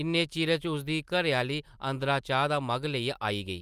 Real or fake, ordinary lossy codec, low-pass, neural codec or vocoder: real; Opus, 32 kbps; 14.4 kHz; none